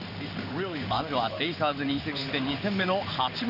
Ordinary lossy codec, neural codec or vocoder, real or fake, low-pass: none; codec, 16 kHz in and 24 kHz out, 1 kbps, XY-Tokenizer; fake; 5.4 kHz